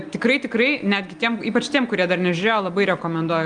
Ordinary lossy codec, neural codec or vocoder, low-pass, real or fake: Opus, 32 kbps; none; 9.9 kHz; real